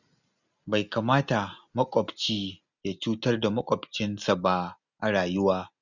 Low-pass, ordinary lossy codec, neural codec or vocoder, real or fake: 7.2 kHz; none; none; real